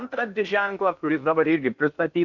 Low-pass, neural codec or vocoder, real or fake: 7.2 kHz; codec, 16 kHz in and 24 kHz out, 0.8 kbps, FocalCodec, streaming, 65536 codes; fake